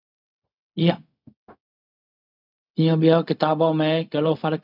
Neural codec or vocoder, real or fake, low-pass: codec, 16 kHz, 0.4 kbps, LongCat-Audio-Codec; fake; 5.4 kHz